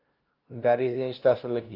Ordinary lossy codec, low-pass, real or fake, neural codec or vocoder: Opus, 24 kbps; 5.4 kHz; fake; codec, 16 kHz, 0.5 kbps, FunCodec, trained on LibriTTS, 25 frames a second